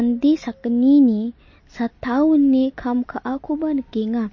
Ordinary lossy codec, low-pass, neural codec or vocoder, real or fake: MP3, 32 kbps; 7.2 kHz; none; real